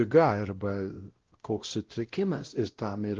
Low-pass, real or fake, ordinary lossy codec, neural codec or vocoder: 7.2 kHz; fake; Opus, 16 kbps; codec, 16 kHz, 0.5 kbps, X-Codec, WavLM features, trained on Multilingual LibriSpeech